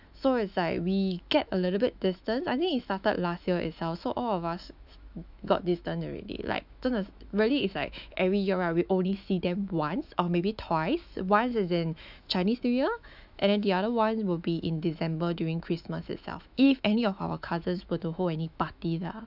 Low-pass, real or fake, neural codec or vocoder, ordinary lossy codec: 5.4 kHz; fake; autoencoder, 48 kHz, 128 numbers a frame, DAC-VAE, trained on Japanese speech; none